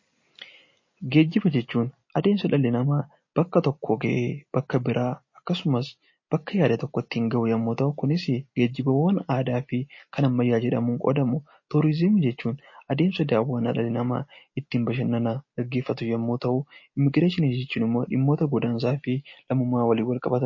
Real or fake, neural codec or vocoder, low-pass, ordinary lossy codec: real; none; 7.2 kHz; MP3, 32 kbps